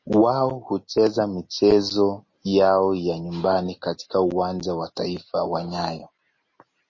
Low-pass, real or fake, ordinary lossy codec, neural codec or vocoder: 7.2 kHz; real; MP3, 32 kbps; none